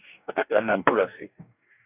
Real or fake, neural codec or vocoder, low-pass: fake; codec, 44.1 kHz, 2.6 kbps, DAC; 3.6 kHz